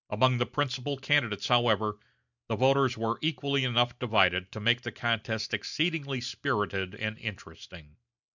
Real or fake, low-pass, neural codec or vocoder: real; 7.2 kHz; none